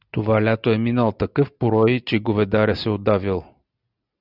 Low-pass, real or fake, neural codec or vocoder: 5.4 kHz; real; none